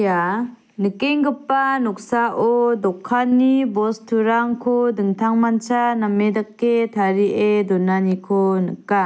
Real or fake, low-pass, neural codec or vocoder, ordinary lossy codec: real; none; none; none